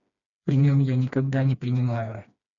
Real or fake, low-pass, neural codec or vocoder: fake; 7.2 kHz; codec, 16 kHz, 2 kbps, FreqCodec, smaller model